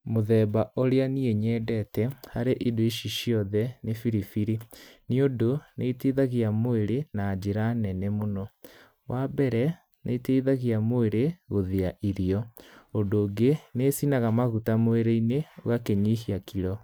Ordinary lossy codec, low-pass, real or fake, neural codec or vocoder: none; none; real; none